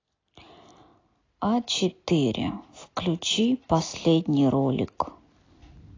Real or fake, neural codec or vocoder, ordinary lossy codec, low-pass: real; none; AAC, 32 kbps; 7.2 kHz